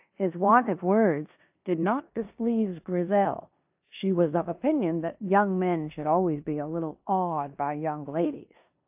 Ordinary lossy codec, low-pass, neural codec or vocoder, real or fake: AAC, 32 kbps; 3.6 kHz; codec, 16 kHz in and 24 kHz out, 0.9 kbps, LongCat-Audio-Codec, four codebook decoder; fake